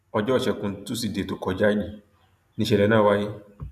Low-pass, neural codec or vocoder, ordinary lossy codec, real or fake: 14.4 kHz; none; none; real